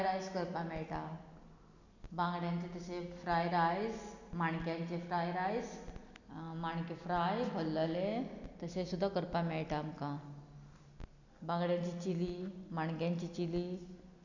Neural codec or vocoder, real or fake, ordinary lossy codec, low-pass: none; real; none; 7.2 kHz